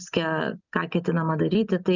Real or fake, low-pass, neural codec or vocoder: real; 7.2 kHz; none